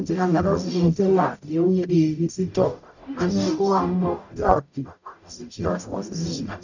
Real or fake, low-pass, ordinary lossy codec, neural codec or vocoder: fake; 7.2 kHz; none; codec, 44.1 kHz, 0.9 kbps, DAC